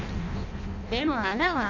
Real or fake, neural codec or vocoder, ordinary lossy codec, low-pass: fake; codec, 16 kHz in and 24 kHz out, 0.6 kbps, FireRedTTS-2 codec; none; 7.2 kHz